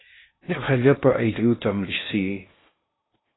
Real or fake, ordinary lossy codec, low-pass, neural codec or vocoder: fake; AAC, 16 kbps; 7.2 kHz; codec, 16 kHz in and 24 kHz out, 0.6 kbps, FocalCodec, streaming, 2048 codes